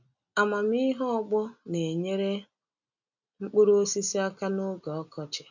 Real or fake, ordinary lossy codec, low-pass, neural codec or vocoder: real; none; 7.2 kHz; none